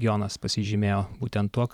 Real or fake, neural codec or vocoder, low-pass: fake; vocoder, 44.1 kHz, 128 mel bands every 512 samples, BigVGAN v2; 19.8 kHz